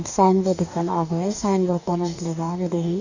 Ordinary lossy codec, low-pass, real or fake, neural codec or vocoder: none; 7.2 kHz; fake; codec, 44.1 kHz, 2.6 kbps, DAC